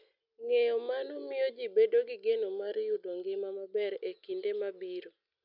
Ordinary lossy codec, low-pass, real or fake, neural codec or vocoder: none; 5.4 kHz; real; none